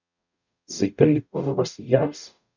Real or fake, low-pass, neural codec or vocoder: fake; 7.2 kHz; codec, 44.1 kHz, 0.9 kbps, DAC